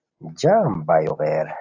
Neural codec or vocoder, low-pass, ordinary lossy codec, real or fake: none; 7.2 kHz; AAC, 48 kbps; real